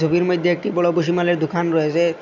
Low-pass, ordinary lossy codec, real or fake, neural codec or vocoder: 7.2 kHz; none; real; none